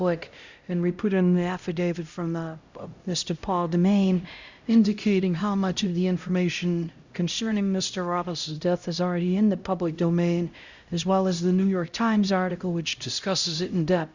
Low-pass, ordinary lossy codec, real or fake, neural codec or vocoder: 7.2 kHz; Opus, 64 kbps; fake; codec, 16 kHz, 0.5 kbps, X-Codec, HuBERT features, trained on LibriSpeech